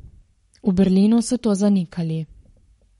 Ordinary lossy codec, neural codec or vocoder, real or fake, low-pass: MP3, 48 kbps; codec, 44.1 kHz, 7.8 kbps, Pupu-Codec; fake; 19.8 kHz